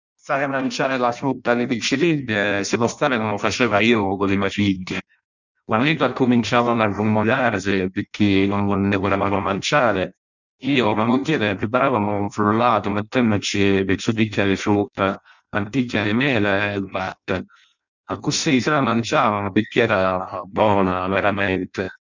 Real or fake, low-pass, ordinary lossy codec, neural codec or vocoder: fake; 7.2 kHz; none; codec, 16 kHz in and 24 kHz out, 0.6 kbps, FireRedTTS-2 codec